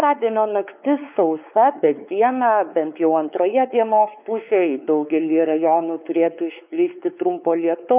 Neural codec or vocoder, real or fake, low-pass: codec, 16 kHz, 4 kbps, X-Codec, WavLM features, trained on Multilingual LibriSpeech; fake; 3.6 kHz